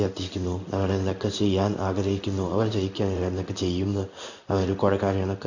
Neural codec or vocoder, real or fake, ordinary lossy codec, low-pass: codec, 16 kHz in and 24 kHz out, 1 kbps, XY-Tokenizer; fake; none; 7.2 kHz